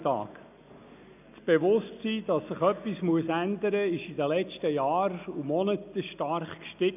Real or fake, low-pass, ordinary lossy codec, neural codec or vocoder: real; 3.6 kHz; none; none